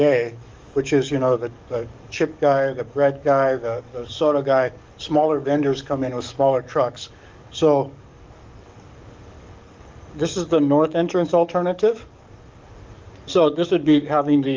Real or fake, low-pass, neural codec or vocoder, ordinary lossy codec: fake; 7.2 kHz; codec, 44.1 kHz, 7.8 kbps, DAC; Opus, 32 kbps